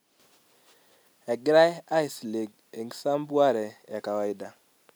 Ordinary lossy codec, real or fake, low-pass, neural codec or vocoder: none; real; none; none